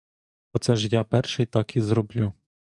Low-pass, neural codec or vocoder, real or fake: 10.8 kHz; codec, 44.1 kHz, 7.8 kbps, DAC; fake